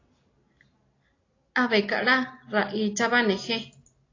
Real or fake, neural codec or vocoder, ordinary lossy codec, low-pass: real; none; AAC, 32 kbps; 7.2 kHz